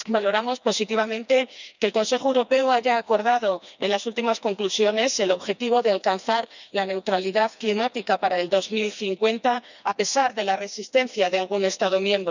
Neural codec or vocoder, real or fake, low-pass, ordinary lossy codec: codec, 16 kHz, 2 kbps, FreqCodec, smaller model; fake; 7.2 kHz; none